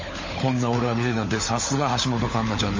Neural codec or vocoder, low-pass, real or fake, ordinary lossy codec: codec, 16 kHz, 16 kbps, FunCodec, trained on LibriTTS, 50 frames a second; 7.2 kHz; fake; MP3, 32 kbps